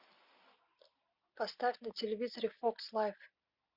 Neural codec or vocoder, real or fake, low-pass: none; real; 5.4 kHz